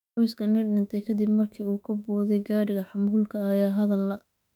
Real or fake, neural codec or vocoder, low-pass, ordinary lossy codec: fake; autoencoder, 48 kHz, 32 numbers a frame, DAC-VAE, trained on Japanese speech; 19.8 kHz; none